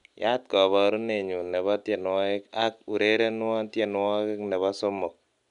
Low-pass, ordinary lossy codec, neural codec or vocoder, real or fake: 10.8 kHz; none; none; real